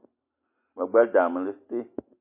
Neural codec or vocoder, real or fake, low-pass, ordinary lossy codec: none; real; 3.6 kHz; MP3, 24 kbps